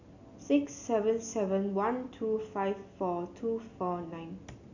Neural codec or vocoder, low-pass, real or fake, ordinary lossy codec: none; 7.2 kHz; real; none